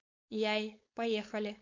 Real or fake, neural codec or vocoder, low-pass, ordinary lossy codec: fake; codec, 16 kHz, 4.8 kbps, FACodec; 7.2 kHz; MP3, 64 kbps